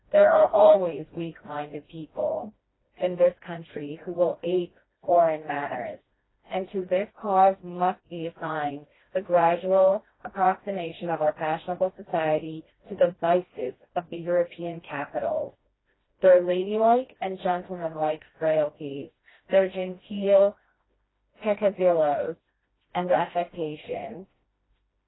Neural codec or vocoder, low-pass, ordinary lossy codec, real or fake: codec, 16 kHz, 1 kbps, FreqCodec, smaller model; 7.2 kHz; AAC, 16 kbps; fake